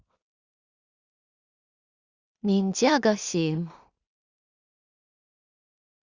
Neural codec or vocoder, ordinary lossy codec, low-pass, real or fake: codec, 16 kHz in and 24 kHz out, 0.4 kbps, LongCat-Audio-Codec, two codebook decoder; Opus, 64 kbps; 7.2 kHz; fake